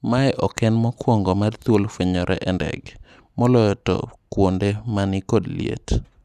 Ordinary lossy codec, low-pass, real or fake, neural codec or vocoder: none; 14.4 kHz; real; none